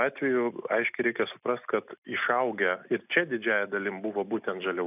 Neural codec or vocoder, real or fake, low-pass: none; real; 3.6 kHz